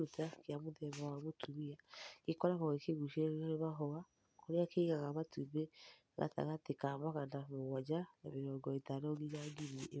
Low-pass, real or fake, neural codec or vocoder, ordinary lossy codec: none; real; none; none